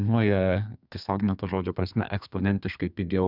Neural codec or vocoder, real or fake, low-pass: codec, 32 kHz, 1.9 kbps, SNAC; fake; 5.4 kHz